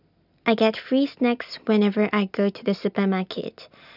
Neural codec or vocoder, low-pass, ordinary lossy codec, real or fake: none; 5.4 kHz; none; real